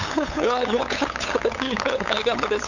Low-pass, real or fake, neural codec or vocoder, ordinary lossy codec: 7.2 kHz; fake; codec, 16 kHz, 8 kbps, FunCodec, trained on LibriTTS, 25 frames a second; none